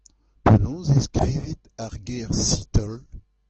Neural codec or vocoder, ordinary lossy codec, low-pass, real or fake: none; Opus, 16 kbps; 7.2 kHz; real